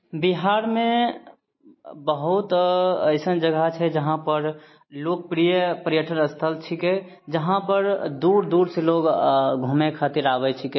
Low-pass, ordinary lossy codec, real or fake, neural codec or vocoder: 7.2 kHz; MP3, 24 kbps; real; none